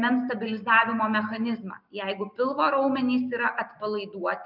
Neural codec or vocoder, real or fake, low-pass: none; real; 5.4 kHz